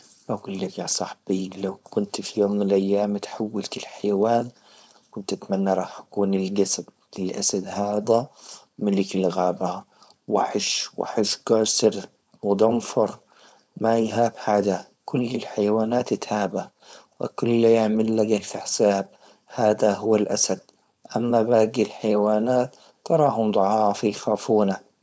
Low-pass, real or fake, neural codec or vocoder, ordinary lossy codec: none; fake; codec, 16 kHz, 4.8 kbps, FACodec; none